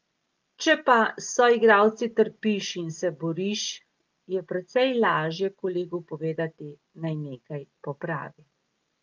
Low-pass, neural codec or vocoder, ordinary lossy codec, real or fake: 7.2 kHz; none; Opus, 24 kbps; real